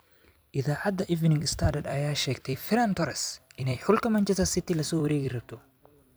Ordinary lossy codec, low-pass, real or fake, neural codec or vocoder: none; none; fake; vocoder, 44.1 kHz, 128 mel bands every 256 samples, BigVGAN v2